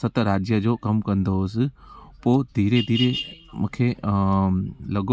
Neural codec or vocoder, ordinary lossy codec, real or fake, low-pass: none; none; real; none